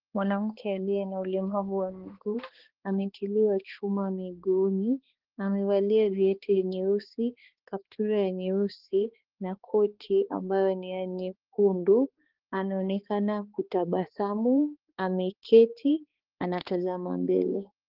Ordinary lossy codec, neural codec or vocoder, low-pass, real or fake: Opus, 16 kbps; codec, 16 kHz, 2 kbps, X-Codec, HuBERT features, trained on balanced general audio; 5.4 kHz; fake